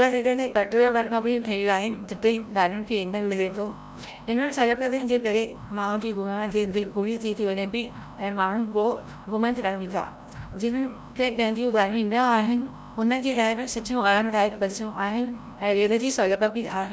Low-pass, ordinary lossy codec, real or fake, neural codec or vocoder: none; none; fake; codec, 16 kHz, 0.5 kbps, FreqCodec, larger model